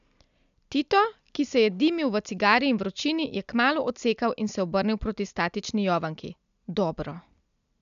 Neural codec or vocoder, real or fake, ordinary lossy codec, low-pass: none; real; none; 7.2 kHz